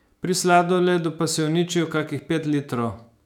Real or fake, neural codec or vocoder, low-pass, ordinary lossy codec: fake; autoencoder, 48 kHz, 128 numbers a frame, DAC-VAE, trained on Japanese speech; 19.8 kHz; none